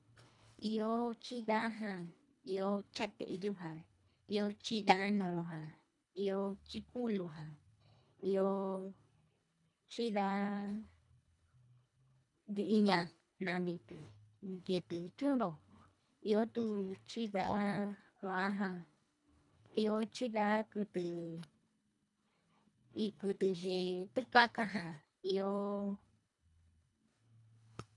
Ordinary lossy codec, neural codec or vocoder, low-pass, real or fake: none; codec, 24 kHz, 1.5 kbps, HILCodec; 10.8 kHz; fake